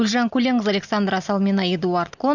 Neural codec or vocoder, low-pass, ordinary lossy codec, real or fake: none; 7.2 kHz; none; real